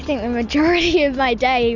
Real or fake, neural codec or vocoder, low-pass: real; none; 7.2 kHz